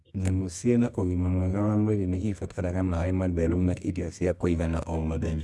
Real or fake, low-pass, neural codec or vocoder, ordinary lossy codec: fake; none; codec, 24 kHz, 0.9 kbps, WavTokenizer, medium music audio release; none